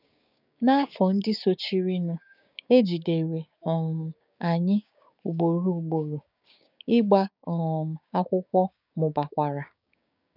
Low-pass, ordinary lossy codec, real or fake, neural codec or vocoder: 5.4 kHz; none; fake; codec, 16 kHz, 6 kbps, DAC